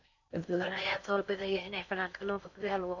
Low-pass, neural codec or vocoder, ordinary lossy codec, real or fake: 7.2 kHz; codec, 16 kHz in and 24 kHz out, 0.6 kbps, FocalCodec, streaming, 4096 codes; none; fake